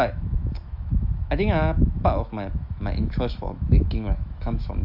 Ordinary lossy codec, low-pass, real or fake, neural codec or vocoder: AAC, 48 kbps; 5.4 kHz; fake; autoencoder, 48 kHz, 128 numbers a frame, DAC-VAE, trained on Japanese speech